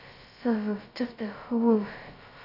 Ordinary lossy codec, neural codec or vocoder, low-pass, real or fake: AAC, 32 kbps; codec, 16 kHz, 0.2 kbps, FocalCodec; 5.4 kHz; fake